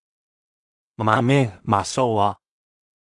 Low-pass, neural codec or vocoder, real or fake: 10.8 kHz; codec, 16 kHz in and 24 kHz out, 0.4 kbps, LongCat-Audio-Codec, two codebook decoder; fake